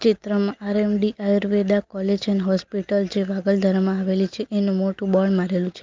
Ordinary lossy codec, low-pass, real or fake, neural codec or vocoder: Opus, 24 kbps; 7.2 kHz; real; none